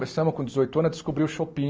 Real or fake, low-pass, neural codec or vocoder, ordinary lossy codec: real; none; none; none